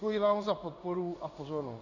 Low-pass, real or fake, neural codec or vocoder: 7.2 kHz; fake; codec, 16 kHz in and 24 kHz out, 1 kbps, XY-Tokenizer